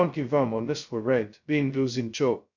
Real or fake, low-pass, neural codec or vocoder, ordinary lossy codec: fake; 7.2 kHz; codec, 16 kHz, 0.2 kbps, FocalCodec; none